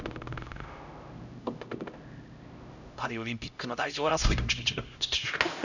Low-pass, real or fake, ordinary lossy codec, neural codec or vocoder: 7.2 kHz; fake; none; codec, 16 kHz, 0.5 kbps, X-Codec, HuBERT features, trained on LibriSpeech